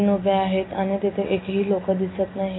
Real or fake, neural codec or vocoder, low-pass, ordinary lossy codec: real; none; 7.2 kHz; AAC, 16 kbps